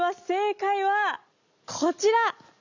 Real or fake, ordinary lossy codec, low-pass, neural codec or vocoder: real; none; 7.2 kHz; none